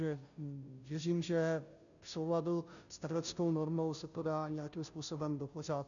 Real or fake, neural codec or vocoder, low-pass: fake; codec, 16 kHz, 0.5 kbps, FunCodec, trained on Chinese and English, 25 frames a second; 7.2 kHz